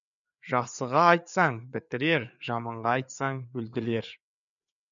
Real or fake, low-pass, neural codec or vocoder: fake; 7.2 kHz; codec, 16 kHz, 4 kbps, FreqCodec, larger model